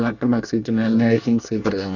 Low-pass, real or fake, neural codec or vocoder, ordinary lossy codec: 7.2 kHz; fake; codec, 24 kHz, 1 kbps, SNAC; none